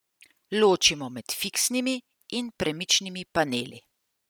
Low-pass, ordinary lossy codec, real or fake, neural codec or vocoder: none; none; real; none